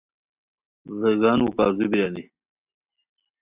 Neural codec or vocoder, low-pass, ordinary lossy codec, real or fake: none; 3.6 kHz; Opus, 64 kbps; real